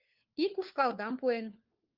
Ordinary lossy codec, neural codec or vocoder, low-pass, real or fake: Opus, 32 kbps; codec, 16 kHz, 4 kbps, FunCodec, trained on LibriTTS, 50 frames a second; 5.4 kHz; fake